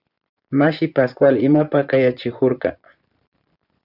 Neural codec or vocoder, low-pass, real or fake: vocoder, 24 kHz, 100 mel bands, Vocos; 5.4 kHz; fake